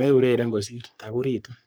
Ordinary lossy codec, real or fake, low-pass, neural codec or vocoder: none; fake; none; codec, 44.1 kHz, 3.4 kbps, Pupu-Codec